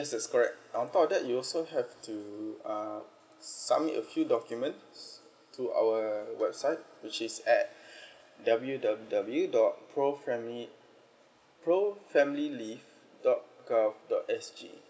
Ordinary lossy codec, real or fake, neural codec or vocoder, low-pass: none; real; none; none